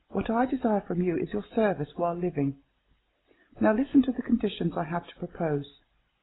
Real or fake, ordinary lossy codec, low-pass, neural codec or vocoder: real; AAC, 16 kbps; 7.2 kHz; none